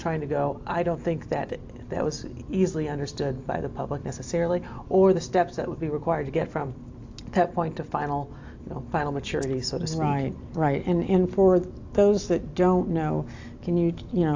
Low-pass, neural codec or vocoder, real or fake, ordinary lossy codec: 7.2 kHz; none; real; AAC, 48 kbps